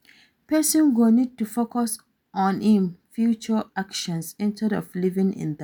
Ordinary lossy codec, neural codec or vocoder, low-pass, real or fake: none; none; none; real